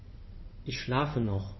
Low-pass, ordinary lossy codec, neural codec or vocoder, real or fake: 7.2 kHz; MP3, 24 kbps; codec, 16 kHz in and 24 kHz out, 2.2 kbps, FireRedTTS-2 codec; fake